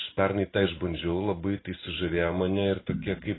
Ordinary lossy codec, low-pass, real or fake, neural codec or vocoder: AAC, 16 kbps; 7.2 kHz; real; none